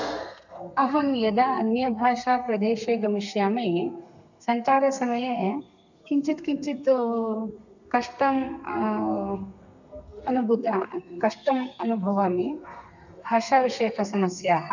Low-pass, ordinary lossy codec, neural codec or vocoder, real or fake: 7.2 kHz; none; codec, 32 kHz, 1.9 kbps, SNAC; fake